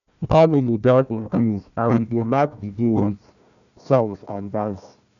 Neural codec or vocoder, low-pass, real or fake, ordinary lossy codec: codec, 16 kHz, 1 kbps, FunCodec, trained on Chinese and English, 50 frames a second; 7.2 kHz; fake; none